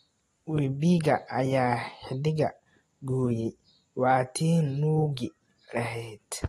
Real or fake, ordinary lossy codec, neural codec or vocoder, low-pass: real; AAC, 32 kbps; none; 19.8 kHz